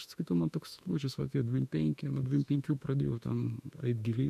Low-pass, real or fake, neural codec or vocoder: 14.4 kHz; fake; autoencoder, 48 kHz, 32 numbers a frame, DAC-VAE, trained on Japanese speech